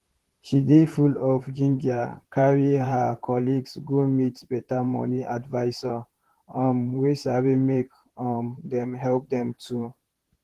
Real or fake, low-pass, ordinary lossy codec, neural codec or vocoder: fake; 14.4 kHz; Opus, 16 kbps; vocoder, 44.1 kHz, 128 mel bands, Pupu-Vocoder